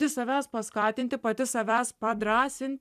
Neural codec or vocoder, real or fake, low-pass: vocoder, 44.1 kHz, 128 mel bands every 256 samples, BigVGAN v2; fake; 14.4 kHz